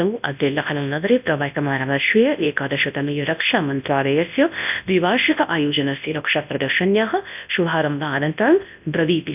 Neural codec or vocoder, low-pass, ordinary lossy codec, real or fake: codec, 24 kHz, 0.9 kbps, WavTokenizer, large speech release; 3.6 kHz; none; fake